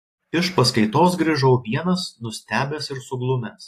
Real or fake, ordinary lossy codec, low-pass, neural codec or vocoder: real; AAC, 48 kbps; 14.4 kHz; none